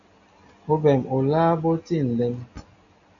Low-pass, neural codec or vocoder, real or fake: 7.2 kHz; none; real